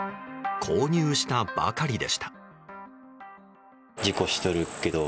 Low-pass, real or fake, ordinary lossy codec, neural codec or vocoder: none; real; none; none